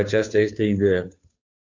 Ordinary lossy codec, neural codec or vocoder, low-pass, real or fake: AAC, 48 kbps; codec, 16 kHz, 2 kbps, FunCodec, trained on Chinese and English, 25 frames a second; 7.2 kHz; fake